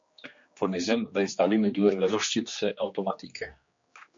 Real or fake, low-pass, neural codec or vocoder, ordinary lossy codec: fake; 7.2 kHz; codec, 16 kHz, 2 kbps, X-Codec, HuBERT features, trained on general audio; MP3, 48 kbps